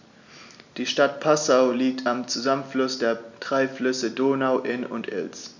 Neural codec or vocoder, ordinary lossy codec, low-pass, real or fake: none; none; 7.2 kHz; real